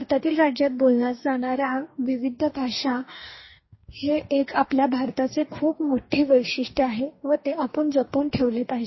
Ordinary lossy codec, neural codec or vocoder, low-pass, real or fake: MP3, 24 kbps; codec, 44.1 kHz, 2.6 kbps, DAC; 7.2 kHz; fake